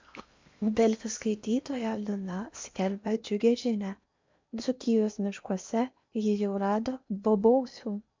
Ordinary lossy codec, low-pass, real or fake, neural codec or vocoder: MP3, 64 kbps; 7.2 kHz; fake; codec, 16 kHz in and 24 kHz out, 0.8 kbps, FocalCodec, streaming, 65536 codes